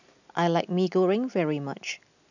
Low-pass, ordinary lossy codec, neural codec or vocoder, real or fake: 7.2 kHz; none; none; real